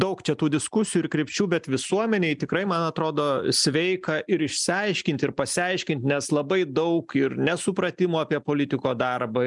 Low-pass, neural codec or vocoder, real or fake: 10.8 kHz; none; real